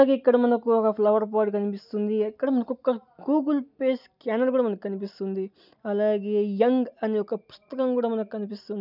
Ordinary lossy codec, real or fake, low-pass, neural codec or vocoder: none; real; 5.4 kHz; none